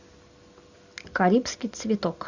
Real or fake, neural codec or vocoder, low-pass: real; none; 7.2 kHz